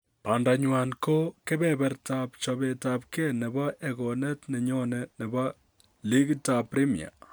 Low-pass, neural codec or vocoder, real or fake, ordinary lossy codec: none; none; real; none